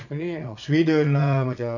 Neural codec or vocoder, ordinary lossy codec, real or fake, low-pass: vocoder, 22.05 kHz, 80 mel bands, Vocos; none; fake; 7.2 kHz